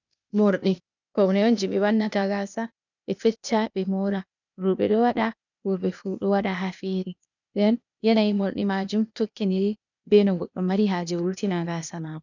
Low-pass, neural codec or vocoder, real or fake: 7.2 kHz; codec, 16 kHz, 0.8 kbps, ZipCodec; fake